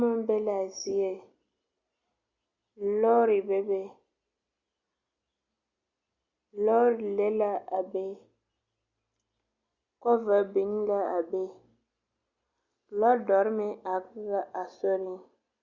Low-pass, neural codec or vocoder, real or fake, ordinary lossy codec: 7.2 kHz; none; real; Opus, 64 kbps